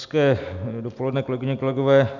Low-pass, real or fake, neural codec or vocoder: 7.2 kHz; real; none